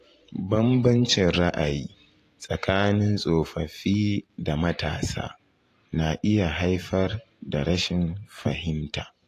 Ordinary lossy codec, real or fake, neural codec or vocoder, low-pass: AAC, 48 kbps; fake; vocoder, 44.1 kHz, 128 mel bands every 512 samples, BigVGAN v2; 14.4 kHz